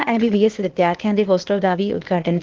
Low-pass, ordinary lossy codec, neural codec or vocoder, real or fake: 7.2 kHz; Opus, 16 kbps; codec, 16 kHz, 0.8 kbps, ZipCodec; fake